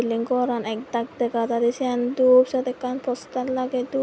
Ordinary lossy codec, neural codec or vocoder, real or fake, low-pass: none; none; real; none